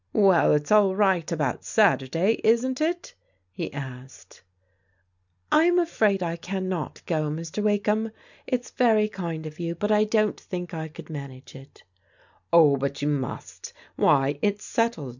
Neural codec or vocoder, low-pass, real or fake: none; 7.2 kHz; real